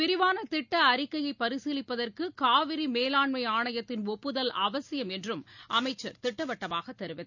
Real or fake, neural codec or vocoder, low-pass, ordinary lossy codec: real; none; 7.2 kHz; none